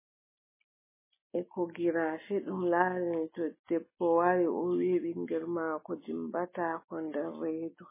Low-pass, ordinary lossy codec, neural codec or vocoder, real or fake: 3.6 kHz; MP3, 16 kbps; none; real